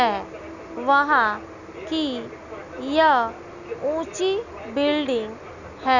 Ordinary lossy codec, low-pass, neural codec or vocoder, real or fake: none; 7.2 kHz; none; real